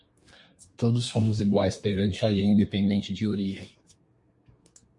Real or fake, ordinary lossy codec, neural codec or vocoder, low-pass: fake; MP3, 48 kbps; codec, 24 kHz, 1 kbps, SNAC; 10.8 kHz